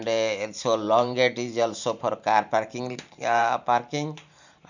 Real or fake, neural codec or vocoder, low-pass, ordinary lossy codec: fake; vocoder, 44.1 kHz, 128 mel bands every 512 samples, BigVGAN v2; 7.2 kHz; none